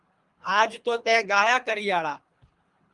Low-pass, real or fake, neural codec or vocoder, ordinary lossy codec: 10.8 kHz; fake; codec, 24 kHz, 3 kbps, HILCodec; Opus, 32 kbps